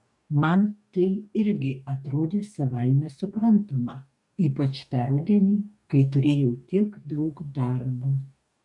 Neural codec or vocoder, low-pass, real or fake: codec, 44.1 kHz, 2.6 kbps, DAC; 10.8 kHz; fake